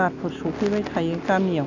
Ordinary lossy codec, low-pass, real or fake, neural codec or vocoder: none; 7.2 kHz; real; none